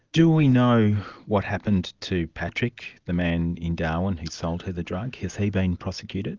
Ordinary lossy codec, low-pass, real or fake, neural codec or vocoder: Opus, 24 kbps; 7.2 kHz; real; none